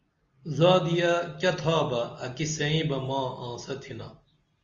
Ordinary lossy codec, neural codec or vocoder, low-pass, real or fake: Opus, 32 kbps; none; 7.2 kHz; real